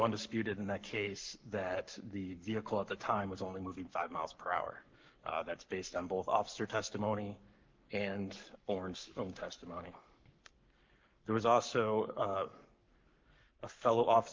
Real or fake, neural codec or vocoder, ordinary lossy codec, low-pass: fake; codec, 44.1 kHz, 7.8 kbps, Pupu-Codec; Opus, 32 kbps; 7.2 kHz